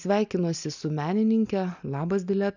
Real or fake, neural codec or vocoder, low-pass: real; none; 7.2 kHz